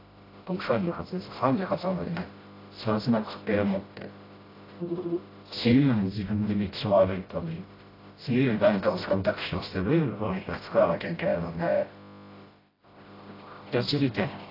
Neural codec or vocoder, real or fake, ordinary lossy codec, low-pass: codec, 16 kHz, 0.5 kbps, FreqCodec, smaller model; fake; AAC, 24 kbps; 5.4 kHz